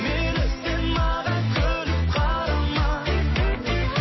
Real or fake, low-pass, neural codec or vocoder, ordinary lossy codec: real; 7.2 kHz; none; MP3, 24 kbps